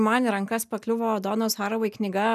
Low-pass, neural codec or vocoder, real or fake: 14.4 kHz; none; real